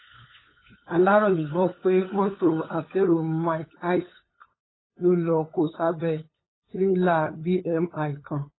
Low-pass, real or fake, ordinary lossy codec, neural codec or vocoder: 7.2 kHz; fake; AAC, 16 kbps; codec, 16 kHz, 8 kbps, FunCodec, trained on LibriTTS, 25 frames a second